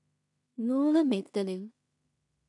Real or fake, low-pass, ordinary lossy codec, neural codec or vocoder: fake; 10.8 kHz; none; codec, 16 kHz in and 24 kHz out, 0.4 kbps, LongCat-Audio-Codec, two codebook decoder